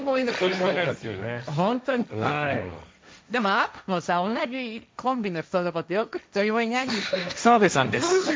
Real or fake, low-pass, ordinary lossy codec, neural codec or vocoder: fake; none; none; codec, 16 kHz, 1.1 kbps, Voila-Tokenizer